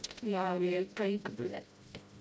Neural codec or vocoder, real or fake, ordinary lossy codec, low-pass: codec, 16 kHz, 0.5 kbps, FreqCodec, smaller model; fake; none; none